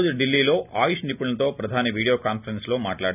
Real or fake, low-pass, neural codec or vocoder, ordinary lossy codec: real; 3.6 kHz; none; none